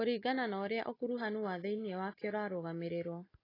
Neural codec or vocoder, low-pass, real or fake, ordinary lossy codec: none; 5.4 kHz; real; AAC, 24 kbps